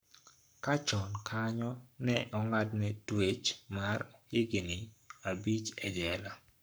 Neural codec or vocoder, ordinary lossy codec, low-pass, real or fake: codec, 44.1 kHz, 7.8 kbps, Pupu-Codec; none; none; fake